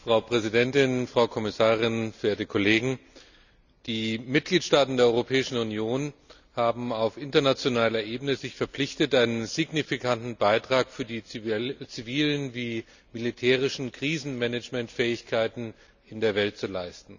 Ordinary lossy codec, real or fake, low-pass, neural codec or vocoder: none; real; 7.2 kHz; none